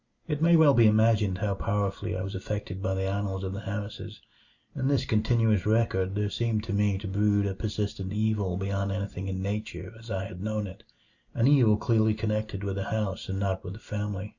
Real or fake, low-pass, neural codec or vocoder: real; 7.2 kHz; none